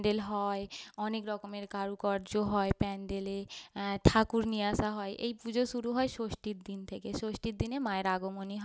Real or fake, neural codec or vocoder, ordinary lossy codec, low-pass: real; none; none; none